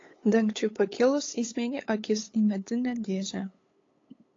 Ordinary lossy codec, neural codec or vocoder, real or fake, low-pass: AAC, 32 kbps; codec, 16 kHz, 8 kbps, FunCodec, trained on LibriTTS, 25 frames a second; fake; 7.2 kHz